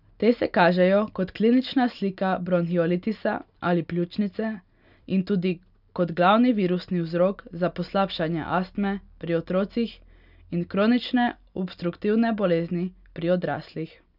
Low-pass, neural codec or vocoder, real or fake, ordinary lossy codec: 5.4 kHz; none; real; none